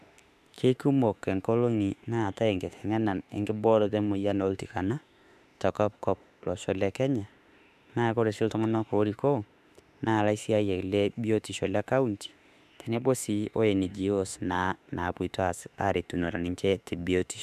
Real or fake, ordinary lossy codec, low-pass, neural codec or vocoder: fake; none; 14.4 kHz; autoencoder, 48 kHz, 32 numbers a frame, DAC-VAE, trained on Japanese speech